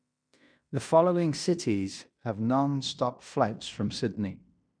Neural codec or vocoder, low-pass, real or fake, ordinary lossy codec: codec, 16 kHz in and 24 kHz out, 0.9 kbps, LongCat-Audio-Codec, fine tuned four codebook decoder; 9.9 kHz; fake; none